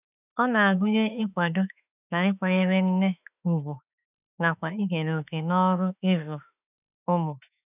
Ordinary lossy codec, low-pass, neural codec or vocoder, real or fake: none; 3.6 kHz; autoencoder, 48 kHz, 32 numbers a frame, DAC-VAE, trained on Japanese speech; fake